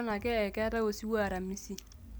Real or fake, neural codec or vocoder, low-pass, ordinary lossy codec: real; none; none; none